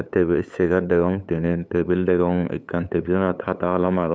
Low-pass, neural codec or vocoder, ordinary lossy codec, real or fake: none; codec, 16 kHz, 8 kbps, FunCodec, trained on LibriTTS, 25 frames a second; none; fake